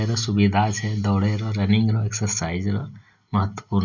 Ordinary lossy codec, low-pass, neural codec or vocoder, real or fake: none; 7.2 kHz; none; real